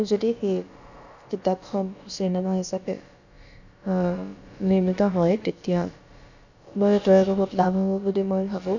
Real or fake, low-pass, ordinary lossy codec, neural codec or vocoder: fake; 7.2 kHz; none; codec, 16 kHz, about 1 kbps, DyCAST, with the encoder's durations